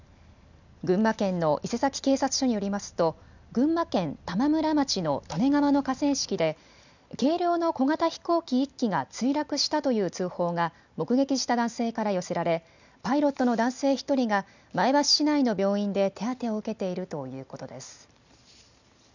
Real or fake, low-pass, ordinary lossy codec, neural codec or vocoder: real; 7.2 kHz; none; none